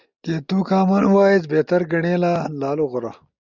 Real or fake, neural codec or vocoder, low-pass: real; none; 7.2 kHz